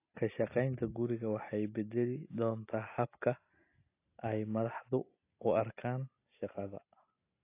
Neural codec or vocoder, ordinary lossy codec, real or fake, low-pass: none; AAC, 24 kbps; real; 3.6 kHz